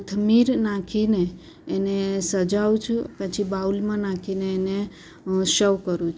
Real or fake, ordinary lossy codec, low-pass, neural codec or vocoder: real; none; none; none